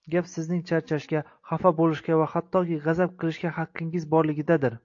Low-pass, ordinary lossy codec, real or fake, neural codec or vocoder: 7.2 kHz; AAC, 48 kbps; real; none